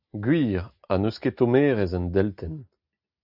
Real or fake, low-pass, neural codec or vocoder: real; 5.4 kHz; none